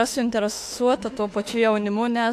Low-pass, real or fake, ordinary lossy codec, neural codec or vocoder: 14.4 kHz; fake; AAC, 64 kbps; autoencoder, 48 kHz, 32 numbers a frame, DAC-VAE, trained on Japanese speech